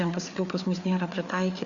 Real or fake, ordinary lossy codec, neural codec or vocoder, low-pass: fake; Opus, 64 kbps; codec, 16 kHz, 4 kbps, FreqCodec, larger model; 7.2 kHz